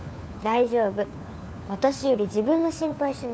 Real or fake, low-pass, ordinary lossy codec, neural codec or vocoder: fake; none; none; codec, 16 kHz, 4 kbps, FunCodec, trained on LibriTTS, 50 frames a second